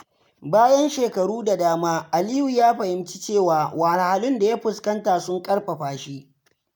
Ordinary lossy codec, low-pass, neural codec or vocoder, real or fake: none; none; none; real